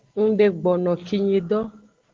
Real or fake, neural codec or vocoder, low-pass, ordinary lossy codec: real; none; 7.2 kHz; Opus, 16 kbps